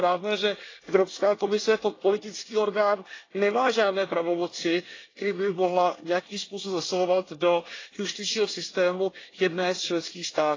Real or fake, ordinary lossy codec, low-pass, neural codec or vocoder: fake; AAC, 32 kbps; 7.2 kHz; codec, 24 kHz, 1 kbps, SNAC